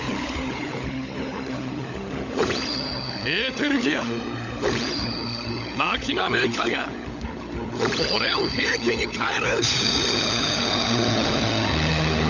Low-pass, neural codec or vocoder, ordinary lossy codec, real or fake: 7.2 kHz; codec, 16 kHz, 16 kbps, FunCodec, trained on LibriTTS, 50 frames a second; none; fake